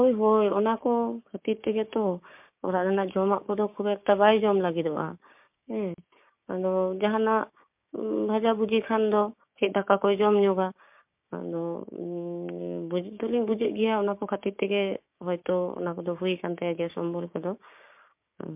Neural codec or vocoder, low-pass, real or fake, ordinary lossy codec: codec, 16 kHz, 6 kbps, DAC; 3.6 kHz; fake; MP3, 32 kbps